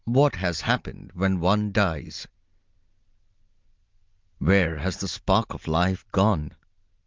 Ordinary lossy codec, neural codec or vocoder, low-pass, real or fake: Opus, 24 kbps; none; 7.2 kHz; real